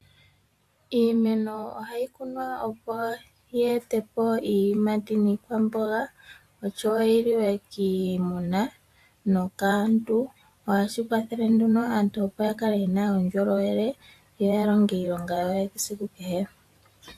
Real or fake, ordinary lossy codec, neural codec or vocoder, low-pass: fake; AAC, 64 kbps; vocoder, 44.1 kHz, 128 mel bands every 512 samples, BigVGAN v2; 14.4 kHz